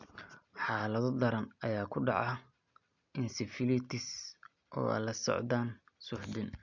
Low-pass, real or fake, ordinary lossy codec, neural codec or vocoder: 7.2 kHz; real; none; none